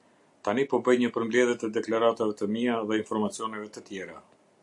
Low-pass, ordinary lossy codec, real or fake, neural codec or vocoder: 10.8 kHz; AAC, 64 kbps; real; none